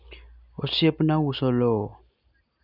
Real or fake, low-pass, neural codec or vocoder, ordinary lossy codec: real; 5.4 kHz; none; none